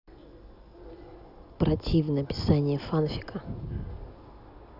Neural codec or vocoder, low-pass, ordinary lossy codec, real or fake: none; 5.4 kHz; none; real